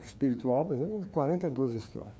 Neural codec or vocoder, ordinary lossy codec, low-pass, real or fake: codec, 16 kHz, 2 kbps, FreqCodec, larger model; none; none; fake